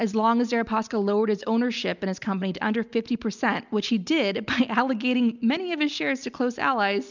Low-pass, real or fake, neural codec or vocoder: 7.2 kHz; real; none